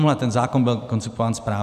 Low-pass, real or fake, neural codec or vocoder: 14.4 kHz; fake; vocoder, 44.1 kHz, 128 mel bands every 512 samples, BigVGAN v2